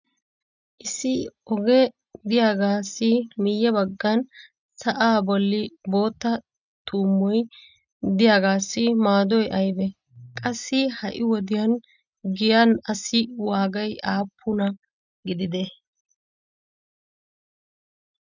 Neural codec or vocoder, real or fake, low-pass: none; real; 7.2 kHz